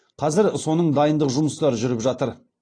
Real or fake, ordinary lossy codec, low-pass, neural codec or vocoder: real; AAC, 32 kbps; 9.9 kHz; none